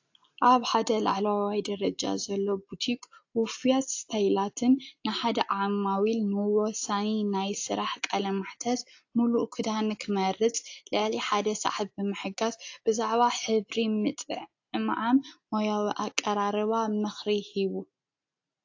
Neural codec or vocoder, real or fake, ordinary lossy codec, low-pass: none; real; AAC, 48 kbps; 7.2 kHz